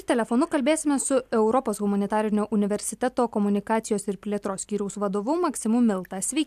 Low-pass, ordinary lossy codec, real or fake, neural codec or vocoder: 14.4 kHz; AAC, 96 kbps; real; none